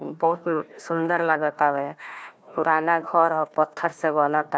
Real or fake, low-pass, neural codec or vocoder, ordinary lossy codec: fake; none; codec, 16 kHz, 1 kbps, FunCodec, trained on Chinese and English, 50 frames a second; none